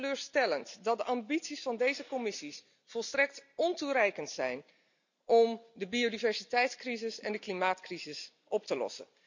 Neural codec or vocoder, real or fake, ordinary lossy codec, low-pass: none; real; none; 7.2 kHz